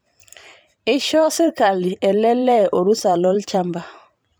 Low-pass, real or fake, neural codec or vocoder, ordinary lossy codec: none; fake; vocoder, 44.1 kHz, 128 mel bands every 512 samples, BigVGAN v2; none